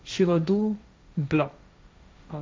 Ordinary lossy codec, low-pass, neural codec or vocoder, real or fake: none; none; codec, 16 kHz, 1.1 kbps, Voila-Tokenizer; fake